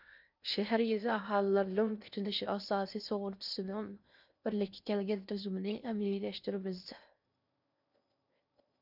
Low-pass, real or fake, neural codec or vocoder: 5.4 kHz; fake; codec, 16 kHz in and 24 kHz out, 0.6 kbps, FocalCodec, streaming, 2048 codes